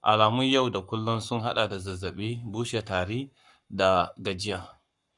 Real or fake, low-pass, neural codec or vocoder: fake; 10.8 kHz; codec, 44.1 kHz, 7.8 kbps, Pupu-Codec